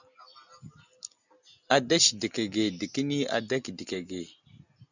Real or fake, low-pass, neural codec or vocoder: real; 7.2 kHz; none